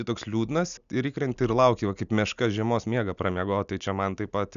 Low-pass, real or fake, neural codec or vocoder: 7.2 kHz; real; none